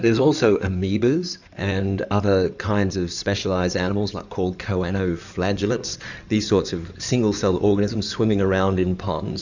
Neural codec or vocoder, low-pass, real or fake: codec, 16 kHz in and 24 kHz out, 2.2 kbps, FireRedTTS-2 codec; 7.2 kHz; fake